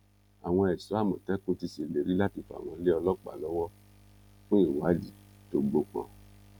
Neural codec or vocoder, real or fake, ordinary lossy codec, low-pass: none; real; none; 19.8 kHz